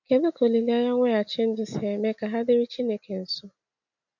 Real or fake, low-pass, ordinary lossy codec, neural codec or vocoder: real; 7.2 kHz; none; none